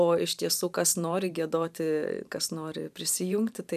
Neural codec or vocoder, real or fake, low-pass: vocoder, 44.1 kHz, 128 mel bands every 512 samples, BigVGAN v2; fake; 14.4 kHz